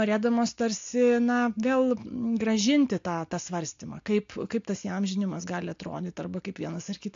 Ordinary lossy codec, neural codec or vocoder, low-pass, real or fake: AAC, 48 kbps; none; 7.2 kHz; real